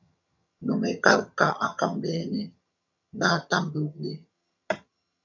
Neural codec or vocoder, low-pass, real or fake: vocoder, 22.05 kHz, 80 mel bands, HiFi-GAN; 7.2 kHz; fake